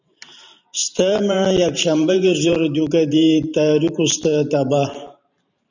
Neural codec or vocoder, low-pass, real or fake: vocoder, 44.1 kHz, 128 mel bands every 512 samples, BigVGAN v2; 7.2 kHz; fake